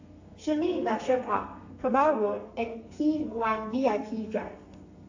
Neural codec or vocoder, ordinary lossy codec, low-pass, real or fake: codec, 32 kHz, 1.9 kbps, SNAC; AAC, 48 kbps; 7.2 kHz; fake